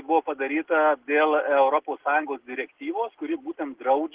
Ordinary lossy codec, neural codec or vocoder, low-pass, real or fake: Opus, 16 kbps; none; 3.6 kHz; real